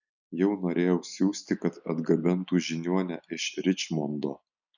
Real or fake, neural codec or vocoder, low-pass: real; none; 7.2 kHz